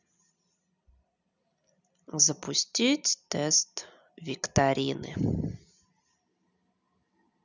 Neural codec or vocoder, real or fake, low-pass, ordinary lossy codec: none; real; 7.2 kHz; none